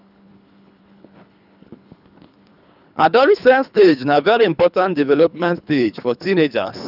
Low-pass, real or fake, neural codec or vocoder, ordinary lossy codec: 5.4 kHz; fake; codec, 24 kHz, 3 kbps, HILCodec; none